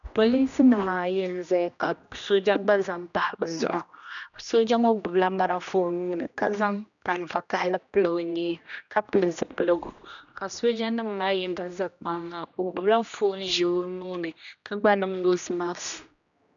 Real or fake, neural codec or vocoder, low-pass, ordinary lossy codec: fake; codec, 16 kHz, 1 kbps, X-Codec, HuBERT features, trained on general audio; 7.2 kHz; MP3, 96 kbps